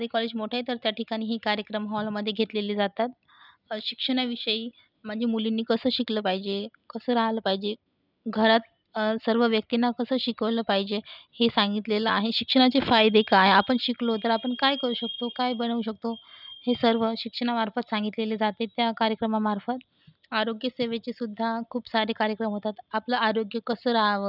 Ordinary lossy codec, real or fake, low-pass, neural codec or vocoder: none; real; 5.4 kHz; none